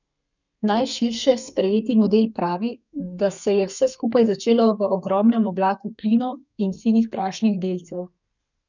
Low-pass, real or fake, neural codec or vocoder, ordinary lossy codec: 7.2 kHz; fake; codec, 32 kHz, 1.9 kbps, SNAC; none